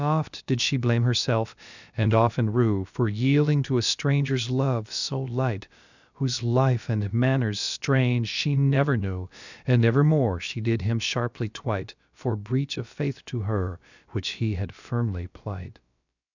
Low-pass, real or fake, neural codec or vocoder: 7.2 kHz; fake; codec, 16 kHz, about 1 kbps, DyCAST, with the encoder's durations